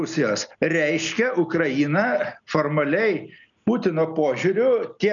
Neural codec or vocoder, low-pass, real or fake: none; 7.2 kHz; real